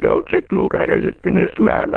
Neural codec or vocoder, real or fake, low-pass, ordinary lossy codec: autoencoder, 22.05 kHz, a latent of 192 numbers a frame, VITS, trained on many speakers; fake; 9.9 kHz; Opus, 16 kbps